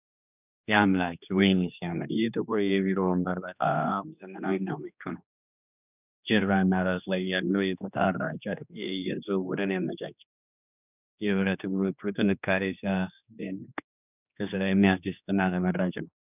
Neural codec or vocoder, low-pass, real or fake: codec, 16 kHz, 2 kbps, X-Codec, HuBERT features, trained on general audio; 3.6 kHz; fake